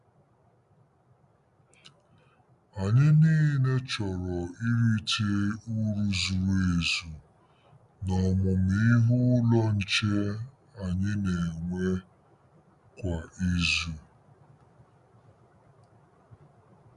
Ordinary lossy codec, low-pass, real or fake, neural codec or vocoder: none; 10.8 kHz; real; none